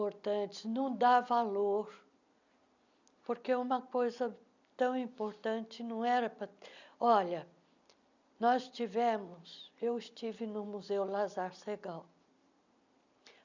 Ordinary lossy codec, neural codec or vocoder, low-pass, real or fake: none; none; 7.2 kHz; real